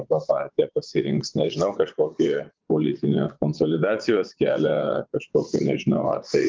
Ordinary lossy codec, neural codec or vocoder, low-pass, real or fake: Opus, 32 kbps; vocoder, 22.05 kHz, 80 mel bands, WaveNeXt; 7.2 kHz; fake